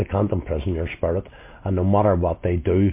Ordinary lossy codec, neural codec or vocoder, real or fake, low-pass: MP3, 24 kbps; none; real; 3.6 kHz